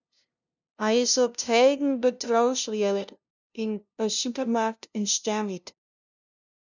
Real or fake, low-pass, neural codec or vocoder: fake; 7.2 kHz; codec, 16 kHz, 0.5 kbps, FunCodec, trained on LibriTTS, 25 frames a second